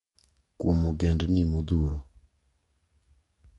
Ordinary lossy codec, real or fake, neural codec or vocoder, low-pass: MP3, 48 kbps; fake; codec, 44.1 kHz, 7.8 kbps, DAC; 19.8 kHz